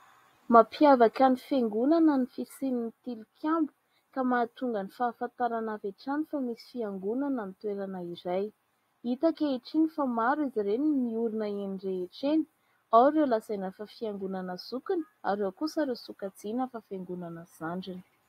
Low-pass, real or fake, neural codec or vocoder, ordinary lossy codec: 19.8 kHz; real; none; AAC, 48 kbps